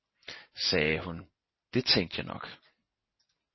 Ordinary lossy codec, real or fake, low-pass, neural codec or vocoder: MP3, 24 kbps; real; 7.2 kHz; none